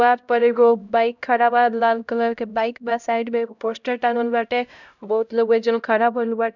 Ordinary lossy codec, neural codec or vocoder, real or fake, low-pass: none; codec, 16 kHz, 0.5 kbps, X-Codec, HuBERT features, trained on LibriSpeech; fake; 7.2 kHz